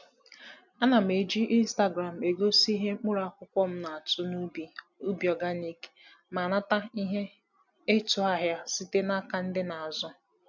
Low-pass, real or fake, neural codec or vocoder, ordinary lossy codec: 7.2 kHz; real; none; none